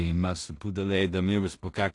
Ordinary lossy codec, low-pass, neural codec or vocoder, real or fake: AAC, 48 kbps; 10.8 kHz; codec, 16 kHz in and 24 kHz out, 0.4 kbps, LongCat-Audio-Codec, two codebook decoder; fake